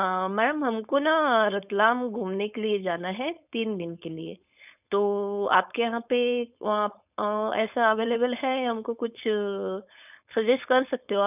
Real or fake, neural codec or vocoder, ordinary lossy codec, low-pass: fake; codec, 16 kHz, 4.8 kbps, FACodec; none; 3.6 kHz